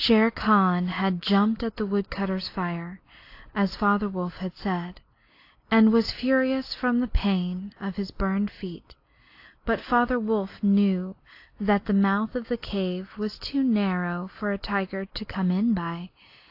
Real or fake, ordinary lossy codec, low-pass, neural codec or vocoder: real; AAC, 32 kbps; 5.4 kHz; none